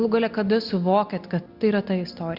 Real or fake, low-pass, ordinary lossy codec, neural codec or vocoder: real; 5.4 kHz; Opus, 64 kbps; none